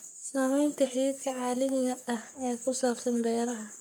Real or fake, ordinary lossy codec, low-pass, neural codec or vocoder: fake; none; none; codec, 44.1 kHz, 3.4 kbps, Pupu-Codec